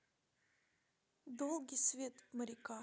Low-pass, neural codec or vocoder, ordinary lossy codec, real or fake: none; none; none; real